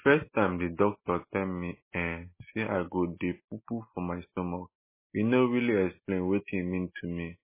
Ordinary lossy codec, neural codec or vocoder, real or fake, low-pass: MP3, 16 kbps; none; real; 3.6 kHz